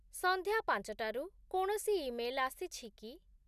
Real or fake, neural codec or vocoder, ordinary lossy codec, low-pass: real; none; none; 14.4 kHz